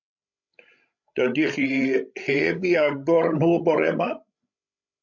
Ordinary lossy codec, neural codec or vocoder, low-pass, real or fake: MP3, 64 kbps; codec, 16 kHz, 16 kbps, FreqCodec, larger model; 7.2 kHz; fake